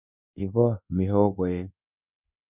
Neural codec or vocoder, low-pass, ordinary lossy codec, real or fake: codec, 24 kHz, 1.2 kbps, DualCodec; 3.6 kHz; none; fake